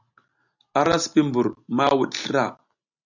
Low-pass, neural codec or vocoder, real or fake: 7.2 kHz; none; real